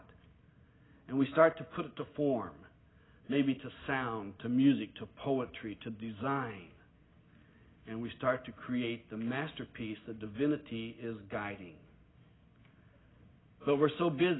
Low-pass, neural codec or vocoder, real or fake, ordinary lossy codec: 7.2 kHz; none; real; AAC, 16 kbps